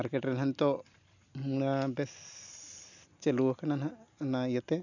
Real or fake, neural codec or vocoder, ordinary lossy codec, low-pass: real; none; none; 7.2 kHz